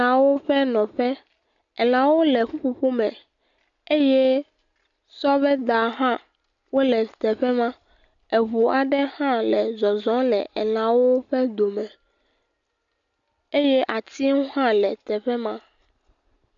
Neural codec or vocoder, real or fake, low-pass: none; real; 7.2 kHz